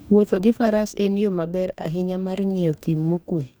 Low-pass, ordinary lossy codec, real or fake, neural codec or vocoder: none; none; fake; codec, 44.1 kHz, 2.6 kbps, DAC